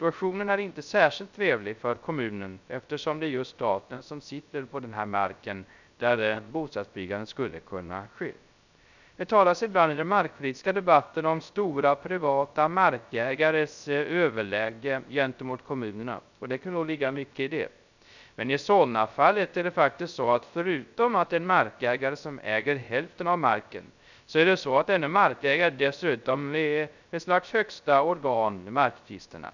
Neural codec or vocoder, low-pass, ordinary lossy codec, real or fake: codec, 16 kHz, 0.3 kbps, FocalCodec; 7.2 kHz; none; fake